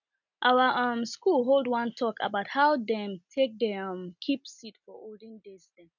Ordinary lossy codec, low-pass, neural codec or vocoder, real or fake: none; 7.2 kHz; none; real